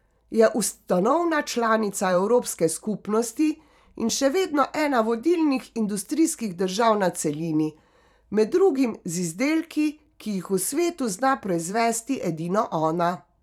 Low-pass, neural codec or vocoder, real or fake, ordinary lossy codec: 19.8 kHz; vocoder, 44.1 kHz, 128 mel bands every 512 samples, BigVGAN v2; fake; none